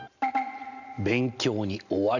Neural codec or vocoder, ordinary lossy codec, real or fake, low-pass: none; none; real; 7.2 kHz